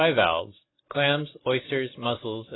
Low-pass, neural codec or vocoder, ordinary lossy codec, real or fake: 7.2 kHz; none; AAC, 16 kbps; real